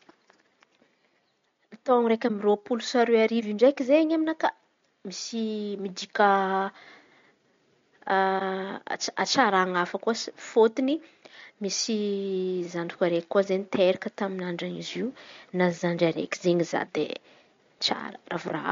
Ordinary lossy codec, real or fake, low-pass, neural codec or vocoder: MP3, 48 kbps; real; 7.2 kHz; none